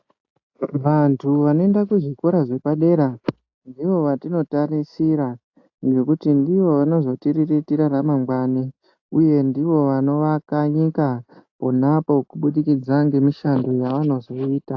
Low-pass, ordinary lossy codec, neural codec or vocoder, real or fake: 7.2 kHz; AAC, 48 kbps; none; real